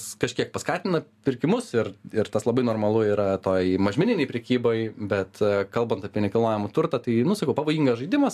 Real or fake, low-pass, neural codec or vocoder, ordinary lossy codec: real; 14.4 kHz; none; AAC, 96 kbps